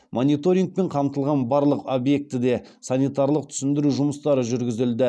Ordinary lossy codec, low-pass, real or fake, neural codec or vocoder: none; none; real; none